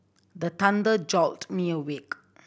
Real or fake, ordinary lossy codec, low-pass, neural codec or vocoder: real; none; none; none